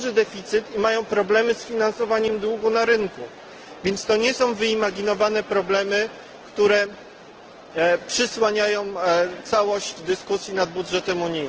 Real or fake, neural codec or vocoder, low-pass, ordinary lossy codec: real; none; 7.2 kHz; Opus, 16 kbps